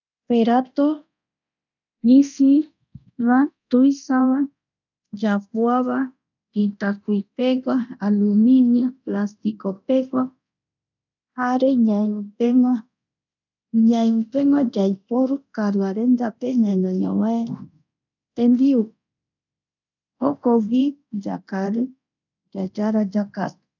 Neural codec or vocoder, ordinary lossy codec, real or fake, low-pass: codec, 24 kHz, 0.9 kbps, DualCodec; none; fake; 7.2 kHz